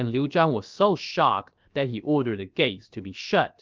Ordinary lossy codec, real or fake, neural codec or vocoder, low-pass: Opus, 24 kbps; fake; codec, 16 kHz, about 1 kbps, DyCAST, with the encoder's durations; 7.2 kHz